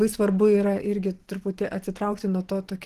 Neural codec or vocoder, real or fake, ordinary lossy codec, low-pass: vocoder, 44.1 kHz, 128 mel bands every 512 samples, BigVGAN v2; fake; Opus, 16 kbps; 14.4 kHz